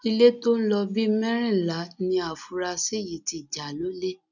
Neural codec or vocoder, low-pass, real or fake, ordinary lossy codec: none; 7.2 kHz; real; none